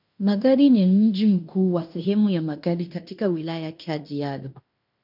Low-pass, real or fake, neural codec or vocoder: 5.4 kHz; fake; codec, 16 kHz in and 24 kHz out, 0.9 kbps, LongCat-Audio-Codec, fine tuned four codebook decoder